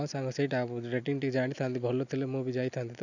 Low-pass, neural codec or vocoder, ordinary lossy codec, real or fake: 7.2 kHz; none; none; real